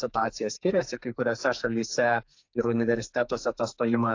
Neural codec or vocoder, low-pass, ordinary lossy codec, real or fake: codec, 44.1 kHz, 2.6 kbps, SNAC; 7.2 kHz; AAC, 48 kbps; fake